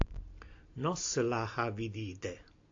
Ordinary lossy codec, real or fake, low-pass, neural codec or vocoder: AAC, 48 kbps; real; 7.2 kHz; none